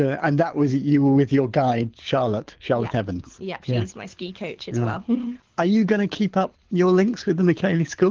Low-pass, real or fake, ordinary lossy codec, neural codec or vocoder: 7.2 kHz; fake; Opus, 16 kbps; codec, 24 kHz, 6 kbps, HILCodec